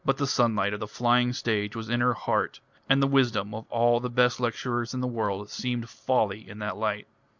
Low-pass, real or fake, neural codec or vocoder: 7.2 kHz; real; none